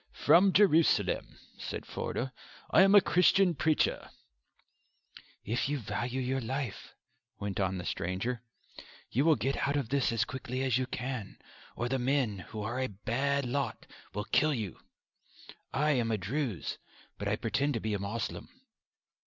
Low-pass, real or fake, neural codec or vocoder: 7.2 kHz; real; none